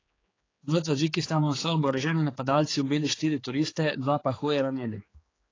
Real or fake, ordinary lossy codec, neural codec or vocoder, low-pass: fake; AAC, 32 kbps; codec, 16 kHz, 4 kbps, X-Codec, HuBERT features, trained on general audio; 7.2 kHz